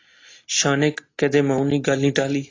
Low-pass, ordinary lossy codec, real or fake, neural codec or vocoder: 7.2 kHz; AAC, 32 kbps; real; none